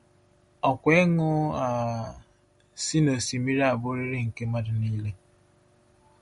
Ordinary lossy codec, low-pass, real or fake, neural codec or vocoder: MP3, 48 kbps; 19.8 kHz; real; none